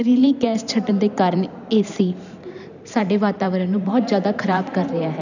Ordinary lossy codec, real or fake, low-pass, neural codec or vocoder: none; fake; 7.2 kHz; vocoder, 44.1 kHz, 128 mel bands every 512 samples, BigVGAN v2